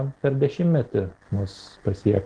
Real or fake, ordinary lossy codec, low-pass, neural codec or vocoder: real; Opus, 16 kbps; 9.9 kHz; none